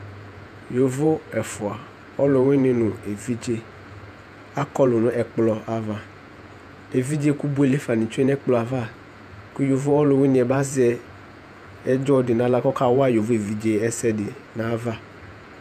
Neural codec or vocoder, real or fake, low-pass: vocoder, 48 kHz, 128 mel bands, Vocos; fake; 14.4 kHz